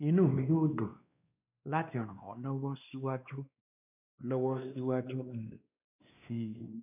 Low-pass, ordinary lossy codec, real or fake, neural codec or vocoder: 3.6 kHz; none; fake; codec, 16 kHz, 2 kbps, X-Codec, WavLM features, trained on Multilingual LibriSpeech